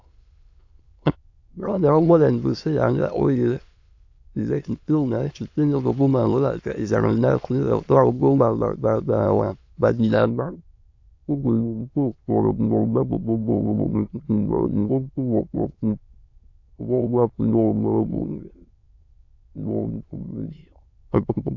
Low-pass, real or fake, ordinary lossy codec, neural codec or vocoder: 7.2 kHz; fake; AAC, 48 kbps; autoencoder, 22.05 kHz, a latent of 192 numbers a frame, VITS, trained on many speakers